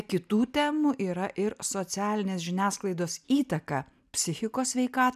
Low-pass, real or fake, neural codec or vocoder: 14.4 kHz; real; none